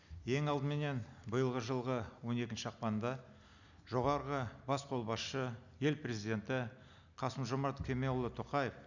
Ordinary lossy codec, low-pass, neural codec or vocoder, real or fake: none; 7.2 kHz; none; real